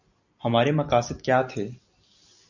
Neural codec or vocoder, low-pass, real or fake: none; 7.2 kHz; real